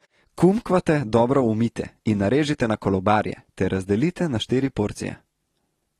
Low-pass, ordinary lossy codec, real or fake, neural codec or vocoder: 19.8 kHz; AAC, 32 kbps; real; none